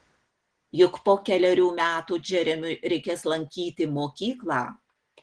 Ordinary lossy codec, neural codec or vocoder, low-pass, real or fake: Opus, 16 kbps; none; 9.9 kHz; real